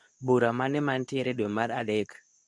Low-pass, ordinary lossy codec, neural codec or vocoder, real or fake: none; none; codec, 24 kHz, 0.9 kbps, WavTokenizer, medium speech release version 2; fake